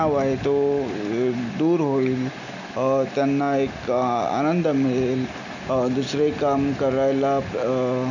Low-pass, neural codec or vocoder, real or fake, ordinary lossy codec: 7.2 kHz; none; real; none